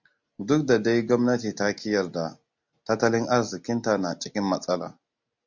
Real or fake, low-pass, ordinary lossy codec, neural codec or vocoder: real; 7.2 kHz; MP3, 64 kbps; none